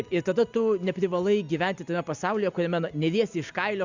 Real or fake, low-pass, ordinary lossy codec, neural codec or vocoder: real; 7.2 kHz; Opus, 64 kbps; none